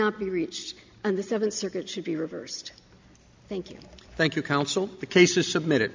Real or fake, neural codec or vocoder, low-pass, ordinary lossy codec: real; none; 7.2 kHz; MP3, 64 kbps